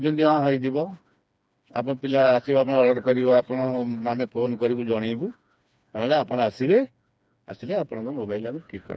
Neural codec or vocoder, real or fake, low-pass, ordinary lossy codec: codec, 16 kHz, 2 kbps, FreqCodec, smaller model; fake; none; none